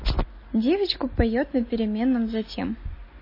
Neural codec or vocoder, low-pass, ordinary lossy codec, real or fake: none; 5.4 kHz; MP3, 24 kbps; real